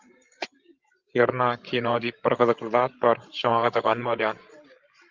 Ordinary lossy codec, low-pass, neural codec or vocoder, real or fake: Opus, 32 kbps; 7.2 kHz; codec, 16 kHz, 16 kbps, FreqCodec, larger model; fake